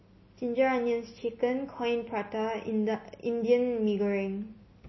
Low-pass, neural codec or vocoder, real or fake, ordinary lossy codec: 7.2 kHz; none; real; MP3, 24 kbps